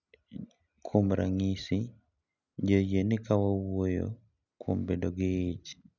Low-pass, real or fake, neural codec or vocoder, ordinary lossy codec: 7.2 kHz; real; none; none